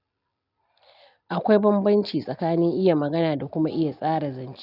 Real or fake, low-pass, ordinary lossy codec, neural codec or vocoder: real; 5.4 kHz; none; none